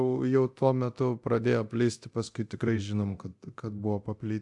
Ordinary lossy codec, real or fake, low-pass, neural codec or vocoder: AAC, 64 kbps; fake; 10.8 kHz; codec, 24 kHz, 0.9 kbps, DualCodec